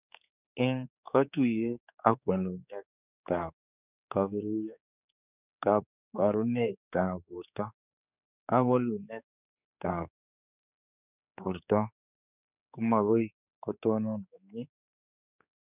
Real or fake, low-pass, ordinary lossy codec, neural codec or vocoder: fake; 3.6 kHz; none; codec, 16 kHz, 4 kbps, X-Codec, HuBERT features, trained on general audio